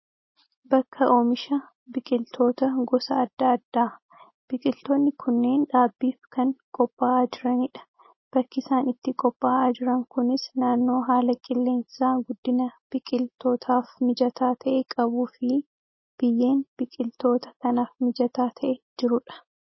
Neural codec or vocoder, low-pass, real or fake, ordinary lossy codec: none; 7.2 kHz; real; MP3, 24 kbps